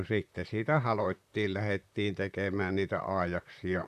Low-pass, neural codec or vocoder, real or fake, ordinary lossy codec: 14.4 kHz; vocoder, 44.1 kHz, 128 mel bands, Pupu-Vocoder; fake; none